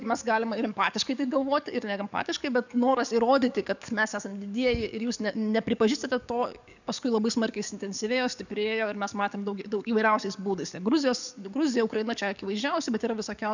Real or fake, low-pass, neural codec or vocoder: fake; 7.2 kHz; codec, 44.1 kHz, 7.8 kbps, DAC